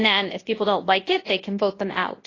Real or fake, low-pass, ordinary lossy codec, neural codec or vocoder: fake; 7.2 kHz; AAC, 32 kbps; codec, 24 kHz, 0.9 kbps, WavTokenizer, large speech release